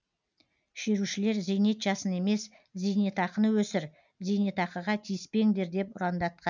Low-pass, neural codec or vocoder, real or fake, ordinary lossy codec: 7.2 kHz; none; real; none